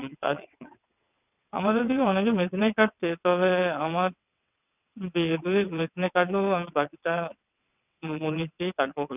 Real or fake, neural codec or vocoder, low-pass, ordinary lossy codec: fake; vocoder, 22.05 kHz, 80 mel bands, WaveNeXt; 3.6 kHz; none